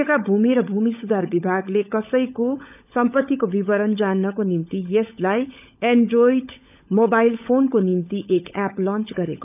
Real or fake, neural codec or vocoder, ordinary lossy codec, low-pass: fake; codec, 16 kHz, 16 kbps, FunCodec, trained on LibriTTS, 50 frames a second; none; 3.6 kHz